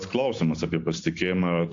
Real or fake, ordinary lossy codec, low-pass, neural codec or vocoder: real; AAC, 48 kbps; 7.2 kHz; none